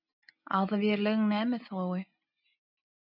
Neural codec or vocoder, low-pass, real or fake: none; 5.4 kHz; real